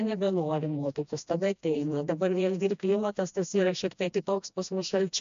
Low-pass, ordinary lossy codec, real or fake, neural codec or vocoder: 7.2 kHz; MP3, 64 kbps; fake; codec, 16 kHz, 1 kbps, FreqCodec, smaller model